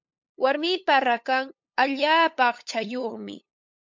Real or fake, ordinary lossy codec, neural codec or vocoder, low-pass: fake; MP3, 64 kbps; codec, 16 kHz, 8 kbps, FunCodec, trained on LibriTTS, 25 frames a second; 7.2 kHz